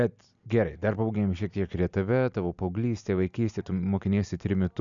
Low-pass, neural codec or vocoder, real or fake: 7.2 kHz; none; real